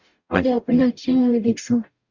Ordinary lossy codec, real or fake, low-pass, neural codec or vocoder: Opus, 64 kbps; fake; 7.2 kHz; codec, 44.1 kHz, 0.9 kbps, DAC